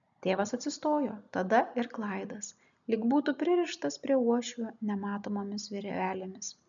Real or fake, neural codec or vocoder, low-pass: real; none; 7.2 kHz